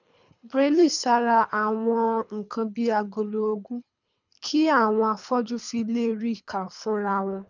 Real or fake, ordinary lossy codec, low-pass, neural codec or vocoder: fake; none; 7.2 kHz; codec, 24 kHz, 3 kbps, HILCodec